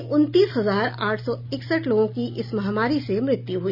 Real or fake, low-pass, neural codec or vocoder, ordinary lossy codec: real; 5.4 kHz; none; none